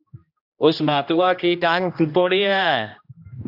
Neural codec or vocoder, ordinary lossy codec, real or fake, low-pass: codec, 16 kHz, 1 kbps, X-Codec, HuBERT features, trained on general audio; AAC, 48 kbps; fake; 5.4 kHz